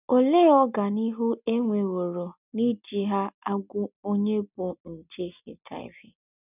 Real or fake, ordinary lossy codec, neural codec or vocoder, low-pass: real; none; none; 3.6 kHz